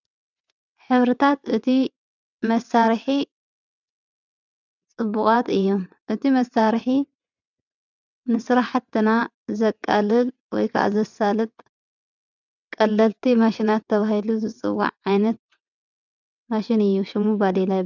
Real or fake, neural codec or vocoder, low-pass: fake; vocoder, 22.05 kHz, 80 mel bands, WaveNeXt; 7.2 kHz